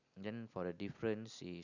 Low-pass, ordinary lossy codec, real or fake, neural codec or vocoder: 7.2 kHz; none; real; none